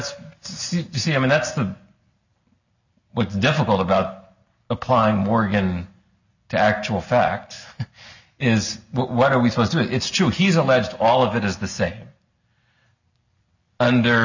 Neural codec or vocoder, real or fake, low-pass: none; real; 7.2 kHz